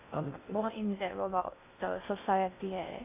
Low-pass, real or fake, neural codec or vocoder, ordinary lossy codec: 3.6 kHz; fake; codec, 16 kHz in and 24 kHz out, 0.6 kbps, FocalCodec, streaming, 2048 codes; AAC, 24 kbps